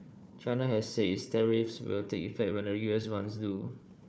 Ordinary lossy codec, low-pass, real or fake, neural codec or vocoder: none; none; fake; codec, 16 kHz, 4 kbps, FunCodec, trained on Chinese and English, 50 frames a second